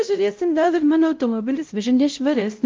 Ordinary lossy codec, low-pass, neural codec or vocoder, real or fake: Opus, 24 kbps; 7.2 kHz; codec, 16 kHz, 0.5 kbps, X-Codec, WavLM features, trained on Multilingual LibriSpeech; fake